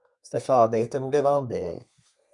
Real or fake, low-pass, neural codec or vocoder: fake; 10.8 kHz; codec, 32 kHz, 1.9 kbps, SNAC